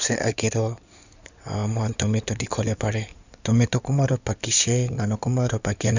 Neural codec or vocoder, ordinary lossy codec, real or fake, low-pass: codec, 16 kHz in and 24 kHz out, 2.2 kbps, FireRedTTS-2 codec; none; fake; 7.2 kHz